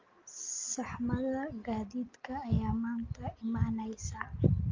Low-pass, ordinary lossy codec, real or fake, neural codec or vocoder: 7.2 kHz; Opus, 32 kbps; real; none